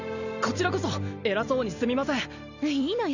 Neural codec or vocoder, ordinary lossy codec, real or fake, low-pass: none; none; real; 7.2 kHz